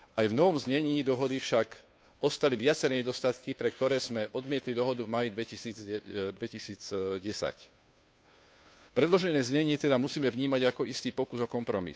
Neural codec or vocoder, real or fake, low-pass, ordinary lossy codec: codec, 16 kHz, 2 kbps, FunCodec, trained on Chinese and English, 25 frames a second; fake; none; none